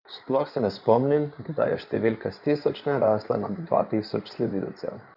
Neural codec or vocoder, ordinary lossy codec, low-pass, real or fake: codec, 16 kHz in and 24 kHz out, 2.2 kbps, FireRedTTS-2 codec; none; 5.4 kHz; fake